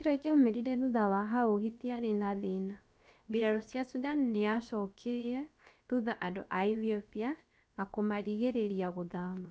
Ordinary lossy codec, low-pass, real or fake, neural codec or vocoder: none; none; fake; codec, 16 kHz, about 1 kbps, DyCAST, with the encoder's durations